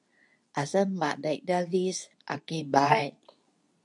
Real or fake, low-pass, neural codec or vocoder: fake; 10.8 kHz; codec, 24 kHz, 0.9 kbps, WavTokenizer, medium speech release version 2